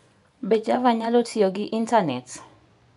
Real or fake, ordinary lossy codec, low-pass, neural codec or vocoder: fake; none; 10.8 kHz; vocoder, 24 kHz, 100 mel bands, Vocos